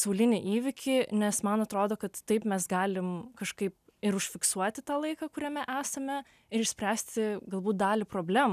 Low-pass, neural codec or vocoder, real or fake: 14.4 kHz; none; real